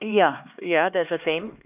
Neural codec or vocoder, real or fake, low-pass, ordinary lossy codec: codec, 16 kHz, 2 kbps, X-Codec, HuBERT features, trained on LibriSpeech; fake; 3.6 kHz; none